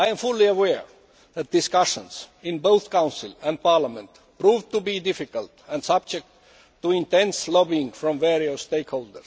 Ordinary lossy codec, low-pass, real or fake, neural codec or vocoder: none; none; real; none